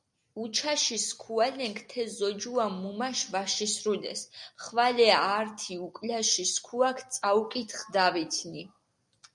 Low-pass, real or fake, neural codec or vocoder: 10.8 kHz; real; none